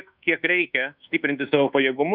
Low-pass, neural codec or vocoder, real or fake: 5.4 kHz; codec, 24 kHz, 1.2 kbps, DualCodec; fake